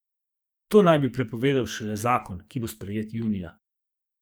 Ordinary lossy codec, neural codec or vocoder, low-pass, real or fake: none; codec, 44.1 kHz, 2.6 kbps, SNAC; none; fake